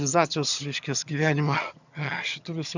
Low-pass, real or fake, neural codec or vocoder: 7.2 kHz; fake; vocoder, 22.05 kHz, 80 mel bands, HiFi-GAN